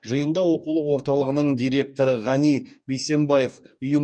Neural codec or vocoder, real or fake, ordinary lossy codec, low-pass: codec, 44.1 kHz, 2.6 kbps, DAC; fake; MP3, 96 kbps; 9.9 kHz